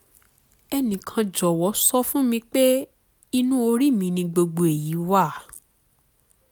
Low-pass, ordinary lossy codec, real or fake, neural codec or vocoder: none; none; real; none